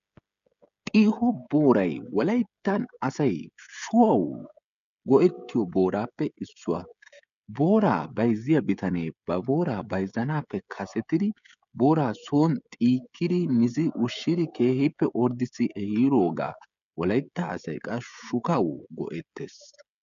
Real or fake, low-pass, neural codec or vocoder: fake; 7.2 kHz; codec, 16 kHz, 16 kbps, FreqCodec, smaller model